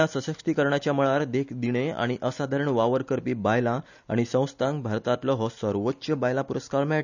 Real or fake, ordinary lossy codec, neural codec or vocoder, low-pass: real; none; none; 7.2 kHz